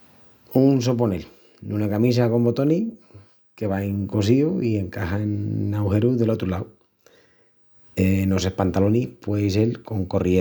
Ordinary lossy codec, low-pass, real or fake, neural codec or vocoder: none; none; real; none